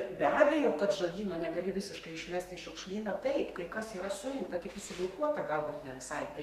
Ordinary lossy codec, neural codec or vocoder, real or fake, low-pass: Opus, 64 kbps; codec, 32 kHz, 1.9 kbps, SNAC; fake; 14.4 kHz